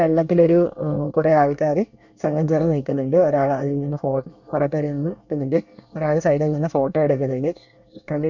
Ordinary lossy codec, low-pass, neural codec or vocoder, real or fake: none; 7.2 kHz; codec, 24 kHz, 1 kbps, SNAC; fake